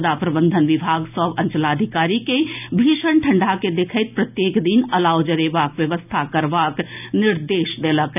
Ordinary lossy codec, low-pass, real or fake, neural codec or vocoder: none; 3.6 kHz; real; none